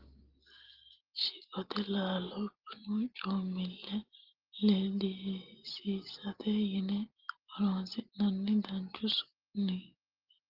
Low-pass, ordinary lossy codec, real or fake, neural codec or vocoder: 5.4 kHz; Opus, 16 kbps; real; none